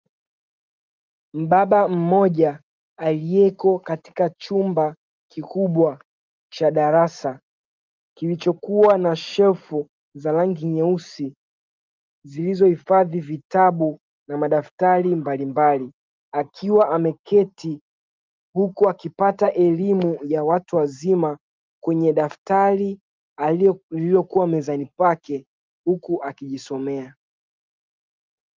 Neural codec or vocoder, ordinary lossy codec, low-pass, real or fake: none; Opus, 32 kbps; 7.2 kHz; real